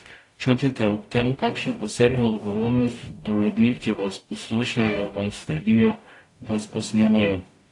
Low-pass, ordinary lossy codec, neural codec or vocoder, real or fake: 10.8 kHz; AAC, 48 kbps; codec, 44.1 kHz, 0.9 kbps, DAC; fake